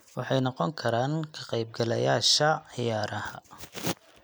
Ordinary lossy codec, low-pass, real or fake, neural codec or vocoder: none; none; real; none